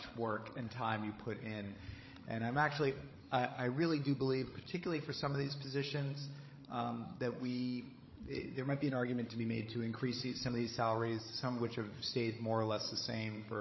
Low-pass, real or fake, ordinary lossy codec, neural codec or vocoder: 7.2 kHz; fake; MP3, 24 kbps; codec, 16 kHz, 16 kbps, FreqCodec, larger model